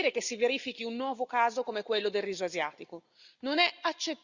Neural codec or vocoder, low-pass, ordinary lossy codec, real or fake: none; 7.2 kHz; Opus, 64 kbps; real